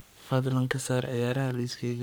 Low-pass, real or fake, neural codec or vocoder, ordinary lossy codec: none; fake; codec, 44.1 kHz, 3.4 kbps, Pupu-Codec; none